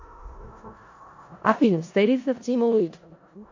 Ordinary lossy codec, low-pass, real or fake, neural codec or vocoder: AAC, 48 kbps; 7.2 kHz; fake; codec, 16 kHz in and 24 kHz out, 0.4 kbps, LongCat-Audio-Codec, four codebook decoder